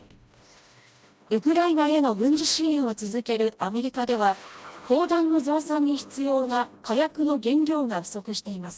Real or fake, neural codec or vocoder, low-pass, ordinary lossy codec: fake; codec, 16 kHz, 1 kbps, FreqCodec, smaller model; none; none